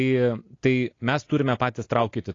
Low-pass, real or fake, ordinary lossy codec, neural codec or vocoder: 7.2 kHz; real; AAC, 32 kbps; none